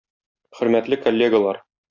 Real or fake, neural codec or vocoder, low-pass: real; none; 7.2 kHz